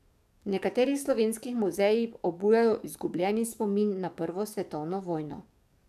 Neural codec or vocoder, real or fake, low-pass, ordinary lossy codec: codec, 44.1 kHz, 7.8 kbps, DAC; fake; 14.4 kHz; none